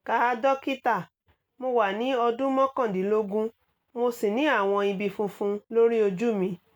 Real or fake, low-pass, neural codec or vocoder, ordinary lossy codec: real; none; none; none